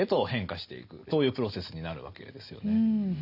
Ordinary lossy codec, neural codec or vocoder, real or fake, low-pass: none; none; real; 5.4 kHz